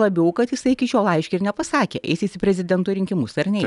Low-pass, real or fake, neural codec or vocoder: 10.8 kHz; real; none